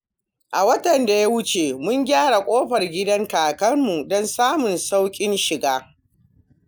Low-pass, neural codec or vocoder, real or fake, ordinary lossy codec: none; none; real; none